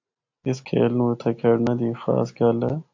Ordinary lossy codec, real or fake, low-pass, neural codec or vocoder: AAC, 48 kbps; real; 7.2 kHz; none